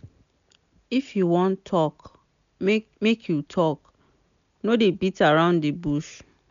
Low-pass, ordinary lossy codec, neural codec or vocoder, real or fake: 7.2 kHz; MP3, 96 kbps; none; real